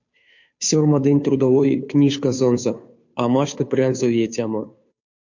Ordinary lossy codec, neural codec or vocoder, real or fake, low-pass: MP3, 48 kbps; codec, 16 kHz, 2 kbps, FunCodec, trained on Chinese and English, 25 frames a second; fake; 7.2 kHz